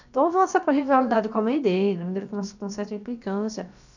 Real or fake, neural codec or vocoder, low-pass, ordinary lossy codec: fake; codec, 16 kHz, about 1 kbps, DyCAST, with the encoder's durations; 7.2 kHz; none